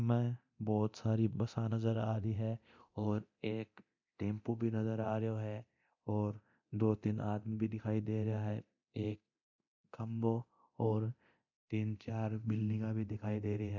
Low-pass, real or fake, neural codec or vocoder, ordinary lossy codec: 7.2 kHz; fake; codec, 24 kHz, 0.9 kbps, DualCodec; MP3, 64 kbps